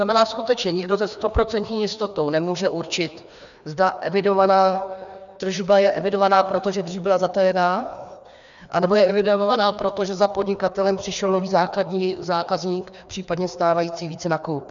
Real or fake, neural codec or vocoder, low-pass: fake; codec, 16 kHz, 2 kbps, FreqCodec, larger model; 7.2 kHz